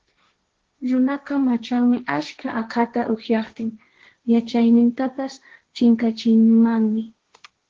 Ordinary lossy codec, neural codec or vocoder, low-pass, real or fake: Opus, 16 kbps; codec, 16 kHz, 1.1 kbps, Voila-Tokenizer; 7.2 kHz; fake